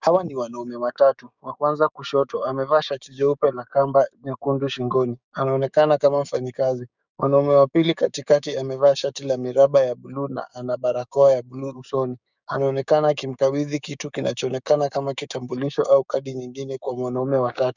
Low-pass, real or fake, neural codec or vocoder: 7.2 kHz; fake; codec, 16 kHz, 6 kbps, DAC